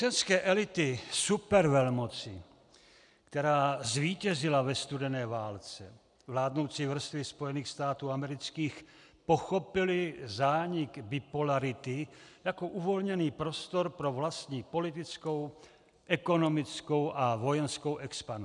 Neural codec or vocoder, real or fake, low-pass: none; real; 10.8 kHz